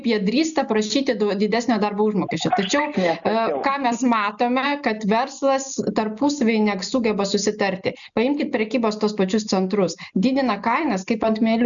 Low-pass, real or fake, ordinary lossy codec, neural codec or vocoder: 7.2 kHz; real; MP3, 96 kbps; none